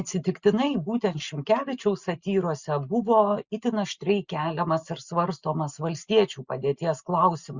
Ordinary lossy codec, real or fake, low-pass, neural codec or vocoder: Opus, 64 kbps; real; 7.2 kHz; none